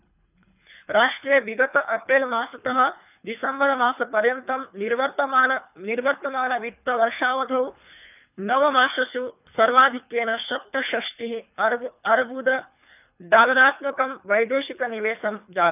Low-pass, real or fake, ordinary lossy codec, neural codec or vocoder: 3.6 kHz; fake; none; codec, 24 kHz, 3 kbps, HILCodec